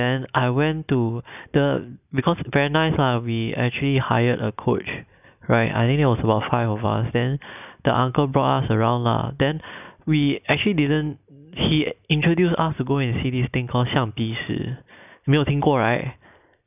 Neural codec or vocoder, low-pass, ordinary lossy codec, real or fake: none; 3.6 kHz; none; real